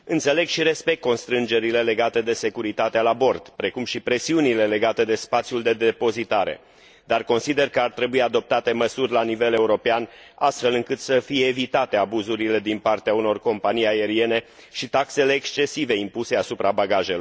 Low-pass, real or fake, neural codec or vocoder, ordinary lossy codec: none; real; none; none